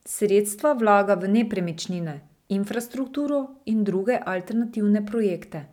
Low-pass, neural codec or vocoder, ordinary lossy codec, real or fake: 19.8 kHz; none; none; real